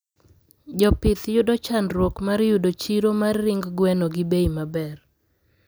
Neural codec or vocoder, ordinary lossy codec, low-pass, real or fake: none; none; none; real